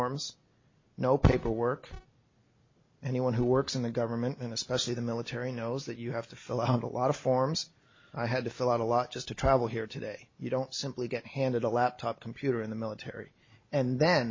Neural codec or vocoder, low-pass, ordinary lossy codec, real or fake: none; 7.2 kHz; MP3, 32 kbps; real